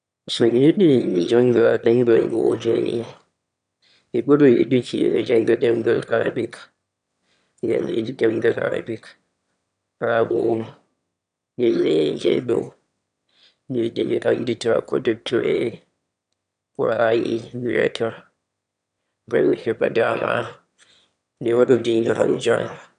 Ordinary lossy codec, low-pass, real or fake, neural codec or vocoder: none; 9.9 kHz; fake; autoencoder, 22.05 kHz, a latent of 192 numbers a frame, VITS, trained on one speaker